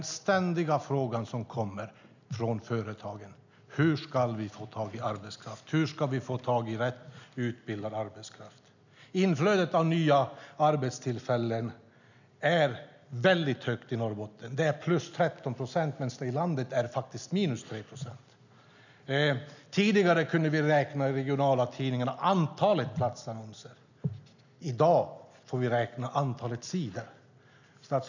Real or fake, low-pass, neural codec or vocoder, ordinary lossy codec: real; 7.2 kHz; none; none